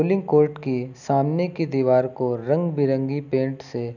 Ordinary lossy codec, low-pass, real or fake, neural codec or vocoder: none; 7.2 kHz; real; none